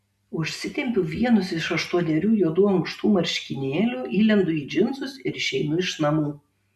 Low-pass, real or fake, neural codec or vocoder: 14.4 kHz; real; none